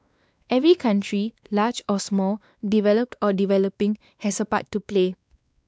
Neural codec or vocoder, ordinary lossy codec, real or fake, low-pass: codec, 16 kHz, 2 kbps, X-Codec, WavLM features, trained on Multilingual LibriSpeech; none; fake; none